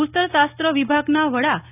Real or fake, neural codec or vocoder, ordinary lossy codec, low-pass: real; none; none; 3.6 kHz